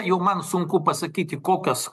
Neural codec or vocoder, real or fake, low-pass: none; real; 14.4 kHz